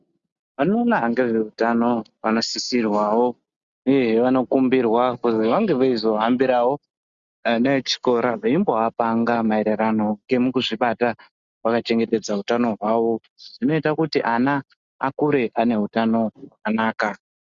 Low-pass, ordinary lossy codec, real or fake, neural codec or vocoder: 7.2 kHz; Opus, 64 kbps; real; none